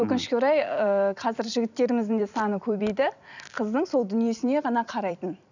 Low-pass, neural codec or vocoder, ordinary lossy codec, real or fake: 7.2 kHz; vocoder, 44.1 kHz, 128 mel bands every 256 samples, BigVGAN v2; none; fake